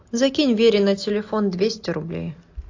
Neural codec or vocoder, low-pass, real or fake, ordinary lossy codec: none; 7.2 kHz; real; AAC, 48 kbps